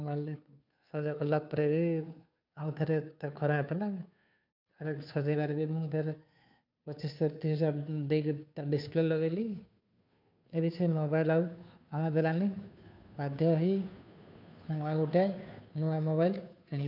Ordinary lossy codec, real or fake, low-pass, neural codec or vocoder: none; fake; 5.4 kHz; codec, 16 kHz, 2 kbps, FunCodec, trained on Chinese and English, 25 frames a second